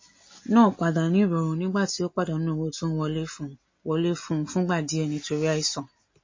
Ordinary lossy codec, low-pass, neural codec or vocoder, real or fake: MP3, 32 kbps; 7.2 kHz; none; real